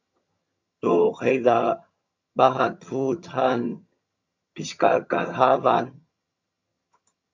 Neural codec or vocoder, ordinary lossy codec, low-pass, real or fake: vocoder, 22.05 kHz, 80 mel bands, HiFi-GAN; MP3, 64 kbps; 7.2 kHz; fake